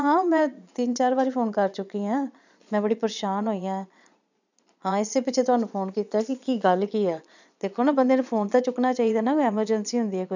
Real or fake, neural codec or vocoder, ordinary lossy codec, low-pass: fake; vocoder, 22.05 kHz, 80 mel bands, Vocos; none; 7.2 kHz